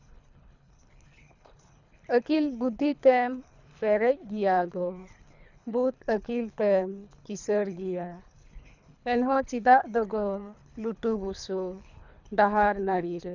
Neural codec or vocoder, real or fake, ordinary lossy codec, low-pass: codec, 24 kHz, 3 kbps, HILCodec; fake; none; 7.2 kHz